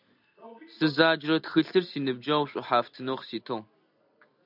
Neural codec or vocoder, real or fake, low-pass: none; real; 5.4 kHz